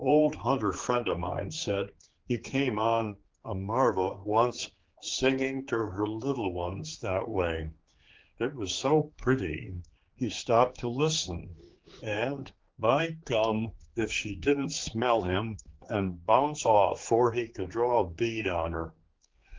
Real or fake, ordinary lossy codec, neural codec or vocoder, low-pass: fake; Opus, 16 kbps; codec, 16 kHz, 4 kbps, X-Codec, HuBERT features, trained on general audio; 7.2 kHz